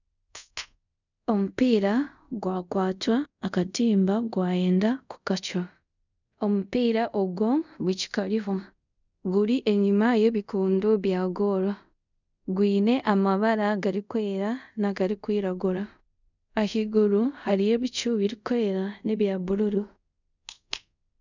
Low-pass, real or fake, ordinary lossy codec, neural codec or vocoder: 7.2 kHz; fake; none; codec, 24 kHz, 0.5 kbps, DualCodec